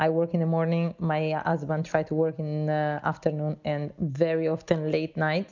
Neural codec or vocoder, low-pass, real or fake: none; 7.2 kHz; real